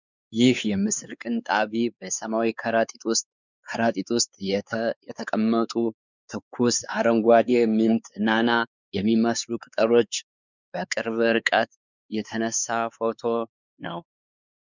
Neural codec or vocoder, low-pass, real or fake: codec, 16 kHz, 4 kbps, X-Codec, WavLM features, trained on Multilingual LibriSpeech; 7.2 kHz; fake